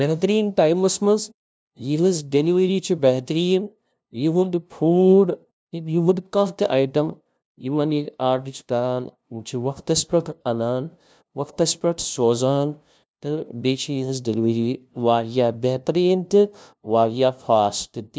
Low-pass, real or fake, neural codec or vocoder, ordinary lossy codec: none; fake; codec, 16 kHz, 0.5 kbps, FunCodec, trained on LibriTTS, 25 frames a second; none